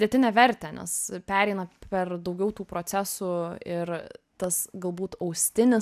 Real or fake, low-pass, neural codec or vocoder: real; 14.4 kHz; none